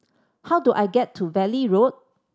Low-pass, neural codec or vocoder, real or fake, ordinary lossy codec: none; none; real; none